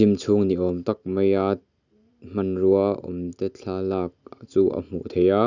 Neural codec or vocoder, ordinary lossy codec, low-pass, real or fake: none; none; 7.2 kHz; real